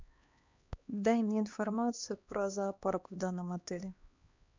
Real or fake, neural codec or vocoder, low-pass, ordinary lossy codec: fake; codec, 16 kHz, 2 kbps, X-Codec, HuBERT features, trained on LibriSpeech; 7.2 kHz; MP3, 64 kbps